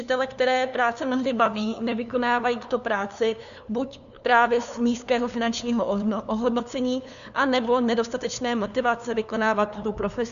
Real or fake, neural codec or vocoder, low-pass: fake; codec, 16 kHz, 2 kbps, FunCodec, trained on LibriTTS, 25 frames a second; 7.2 kHz